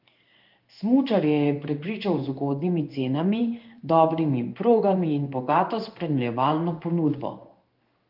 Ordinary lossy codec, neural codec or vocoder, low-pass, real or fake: Opus, 32 kbps; codec, 16 kHz in and 24 kHz out, 1 kbps, XY-Tokenizer; 5.4 kHz; fake